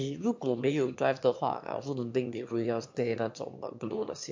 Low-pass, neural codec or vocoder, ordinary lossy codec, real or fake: 7.2 kHz; autoencoder, 22.05 kHz, a latent of 192 numbers a frame, VITS, trained on one speaker; MP3, 48 kbps; fake